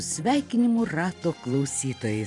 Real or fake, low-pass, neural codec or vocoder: fake; 10.8 kHz; vocoder, 44.1 kHz, 128 mel bands every 512 samples, BigVGAN v2